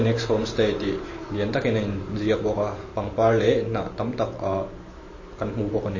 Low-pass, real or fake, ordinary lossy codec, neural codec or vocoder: 7.2 kHz; fake; MP3, 32 kbps; vocoder, 44.1 kHz, 128 mel bands every 512 samples, BigVGAN v2